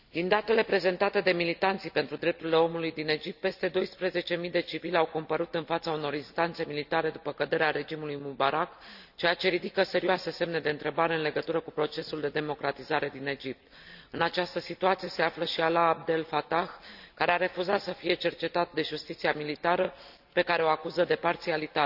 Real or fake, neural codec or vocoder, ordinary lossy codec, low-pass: real; none; none; 5.4 kHz